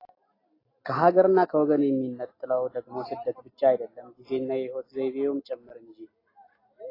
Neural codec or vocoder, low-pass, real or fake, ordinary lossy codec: none; 5.4 kHz; real; AAC, 24 kbps